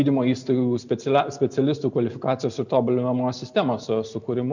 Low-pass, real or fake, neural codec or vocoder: 7.2 kHz; real; none